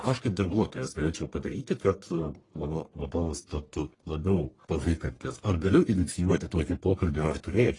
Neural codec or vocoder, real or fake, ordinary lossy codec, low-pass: codec, 44.1 kHz, 1.7 kbps, Pupu-Codec; fake; AAC, 32 kbps; 10.8 kHz